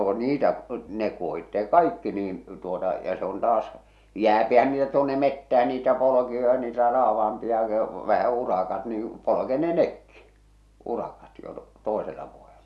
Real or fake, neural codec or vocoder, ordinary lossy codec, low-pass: real; none; none; none